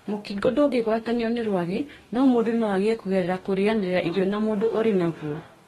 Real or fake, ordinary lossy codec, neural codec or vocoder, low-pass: fake; AAC, 32 kbps; codec, 44.1 kHz, 2.6 kbps, DAC; 19.8 kHz